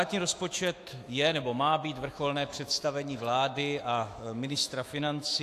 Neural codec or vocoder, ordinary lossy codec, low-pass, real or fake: autoencoder, 48 kHz, 128 numbers a frame, DAC-VAE, trained on Japanese speech; AAC, 64 kbps; 14.4 kHz; fake